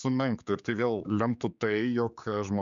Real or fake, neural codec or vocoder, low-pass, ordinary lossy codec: fake; codec, 16 kHz, 4 kbps, X-Codec, HuBERT features, trained on general audio; 7.2 kHz; MP3, 96 kbps